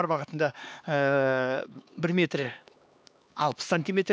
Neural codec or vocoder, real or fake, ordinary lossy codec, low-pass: codec, 16 kHz, 2 kbps, X-Codec, HuBERT features, trained on LibriSpeech; fake; none; none